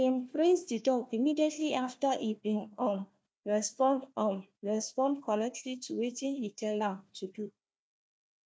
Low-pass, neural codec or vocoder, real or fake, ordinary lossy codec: none; codec, 16 kHz, 1 kbps, FunCodec, trained on Chinese and English, 50 frames a second; fake; none